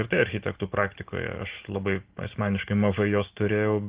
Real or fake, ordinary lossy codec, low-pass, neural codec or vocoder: real; Opus, 16 kbps; 3.6 kHz; none